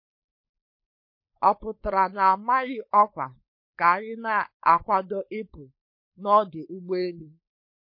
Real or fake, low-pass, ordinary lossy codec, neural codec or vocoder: fake; 5.4 kHz; MP3, 32 kbps; codec, 16 kHz, 4.8 kbps, FACodec